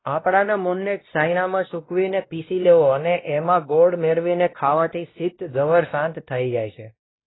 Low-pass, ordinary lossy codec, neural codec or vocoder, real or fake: 7.2 kHz; AAC, 16 kbps; codec, 16 kHz, 1 kbps, X-Codec, WavLM features, trained on Multilingual LibriSpeech; fake